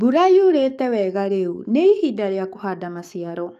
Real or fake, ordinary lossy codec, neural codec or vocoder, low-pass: fake; none; codec, 44.1 kHz, 7.8 kbps, DAC; 14.4 kHz